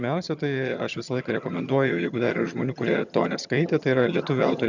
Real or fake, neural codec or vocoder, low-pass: fake; vocoder, 22.05 kHz, 80 mel bands, HiFi-GAN; 7.2 kHz